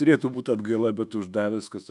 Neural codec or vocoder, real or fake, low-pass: codec, 24 kHz, 1.2 kbps, DualCodec; fake; 10.8 kHz